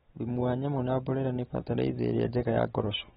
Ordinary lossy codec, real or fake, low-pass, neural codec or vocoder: AAC, 16 kbps; real; 19.8 kHz; none